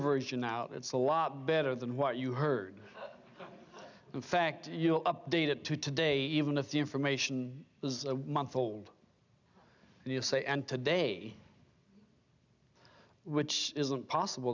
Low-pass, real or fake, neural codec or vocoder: 7.2 kHz; real; none